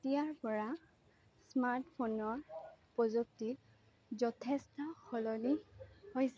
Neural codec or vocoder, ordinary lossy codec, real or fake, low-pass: none; none; real; none